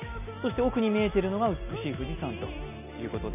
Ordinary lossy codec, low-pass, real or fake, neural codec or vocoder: none; 3.6 kHz; real; none